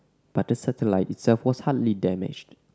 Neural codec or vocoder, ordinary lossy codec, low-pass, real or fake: none; none; none; real